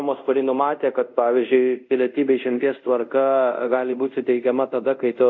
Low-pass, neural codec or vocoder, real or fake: 7.2 kHz; codec, 24 kHz, 0.5 kbps, DualCodec; fake